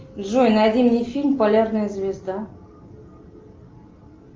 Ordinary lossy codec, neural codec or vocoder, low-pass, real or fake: Opus, 24 kbps; none; 7.2 kHz; real